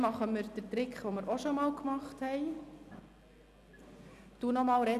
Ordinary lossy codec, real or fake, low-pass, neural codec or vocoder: none; real; 14.4 kHz; none